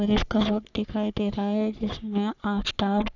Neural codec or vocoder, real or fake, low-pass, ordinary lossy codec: codec, 44.1 kHz, 3.4 kbps, Pupu-Codec; fake; 7.2 kHz; none